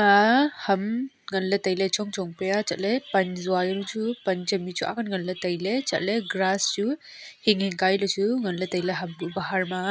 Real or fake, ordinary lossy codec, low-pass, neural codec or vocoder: real; none; none; none